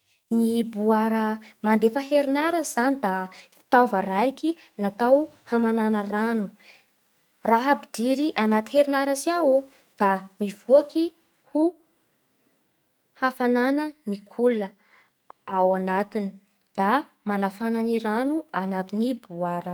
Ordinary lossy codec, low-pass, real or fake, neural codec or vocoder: none; none; fake; codec, 44.1 kHz, 2.6 kbps, SNAC